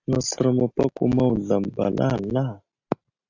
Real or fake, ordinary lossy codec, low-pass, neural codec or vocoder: real; AAC, 48 kbps; 7.2 kHz; none